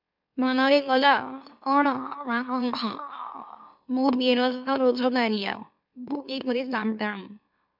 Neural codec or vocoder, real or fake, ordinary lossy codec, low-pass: autoencoder, 44.1 kHz, a latent of 192 numbers a frame, MeloTTS; fake; MP3, 48 kbps; 5.4 kHz